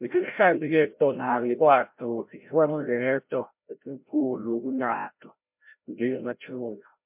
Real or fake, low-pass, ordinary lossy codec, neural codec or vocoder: fake; 3.6 kHz; MP3, 32 kbps; codec, 16 kHz, 0.5 kbps, FreqCodec, larger model